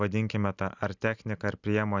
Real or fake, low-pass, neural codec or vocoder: real; 7.2 kHz; none